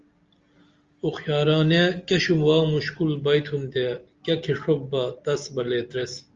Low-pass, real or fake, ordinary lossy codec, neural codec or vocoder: 7.2 kHz; real; Opus, 32 kbps; none